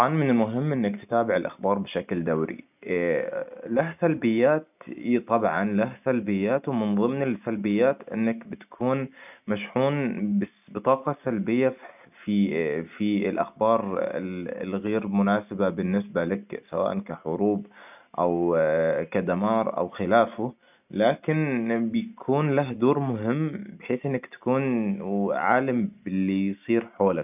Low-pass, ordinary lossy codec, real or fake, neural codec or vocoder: 3.6 kHz; none; real; none